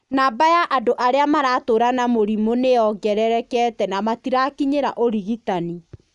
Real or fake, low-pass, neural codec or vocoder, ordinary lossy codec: real; 10.8 kHz; none; none